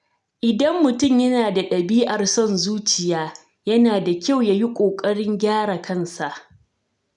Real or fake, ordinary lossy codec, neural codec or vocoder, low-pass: real; none; none; 10.8 kHz